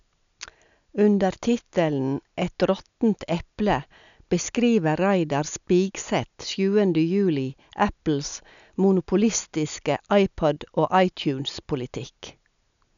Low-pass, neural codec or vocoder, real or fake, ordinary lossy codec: 7.2 kHz; none; real; none